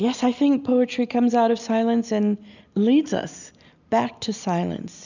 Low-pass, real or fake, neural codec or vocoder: 7.2 kHz; real; none